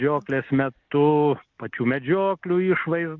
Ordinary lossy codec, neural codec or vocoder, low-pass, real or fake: Opus, 24 kbps; none; 7.2 kHz; real